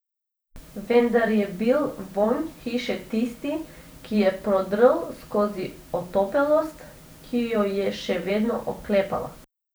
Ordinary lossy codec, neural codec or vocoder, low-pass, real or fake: none; vocoder, 44.1 kHz, 128 mel bands every 512 samples, BigVGAN v2; none; fake